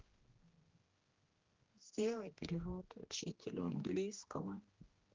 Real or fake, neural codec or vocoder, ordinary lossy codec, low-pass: fake; codec, 16 kHz, 1 kbps, X-Codec, HuBERT features, trained on general audio; Opus, 16 kbps; 7.2 kHz